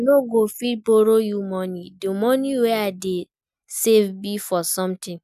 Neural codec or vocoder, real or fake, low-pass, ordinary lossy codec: none; real; 14.4 kHz; none